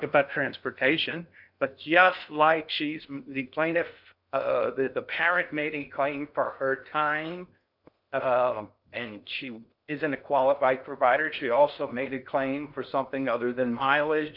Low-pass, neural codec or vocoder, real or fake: 5.4 kHz; codec, 16 kHz in and 24 kHz out, 0.6 kbps, FocalCodec, streaming, 2048 codes; fake